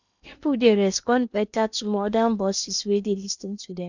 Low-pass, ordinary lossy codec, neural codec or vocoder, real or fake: 7.2 kHz; none; codec, 16 kHz in and 24 kHz out, 0.8 kbps, FocalCodec, streaming, 65536 codes; fake